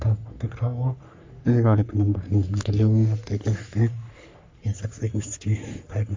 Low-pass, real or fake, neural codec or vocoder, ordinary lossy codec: 7.2 kHz; fake; codec, 44.1 kHz, 3.4 kbps, Pupu-Codec; MP3, 64 kbps